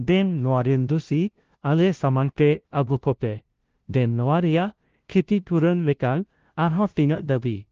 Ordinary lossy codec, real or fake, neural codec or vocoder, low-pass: Opus, 16 kbps; fake; codec, 16 kHz, 0.5 kbps, FunCodec, trained on LibriTTS, 25 frames a second; 7.2 kHz